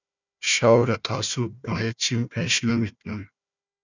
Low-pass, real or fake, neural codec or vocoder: 7.2 kHz; fake; codec, 16 kHz, 1 kbps, FunCodec, trained on Chinese and English, 50 frames a second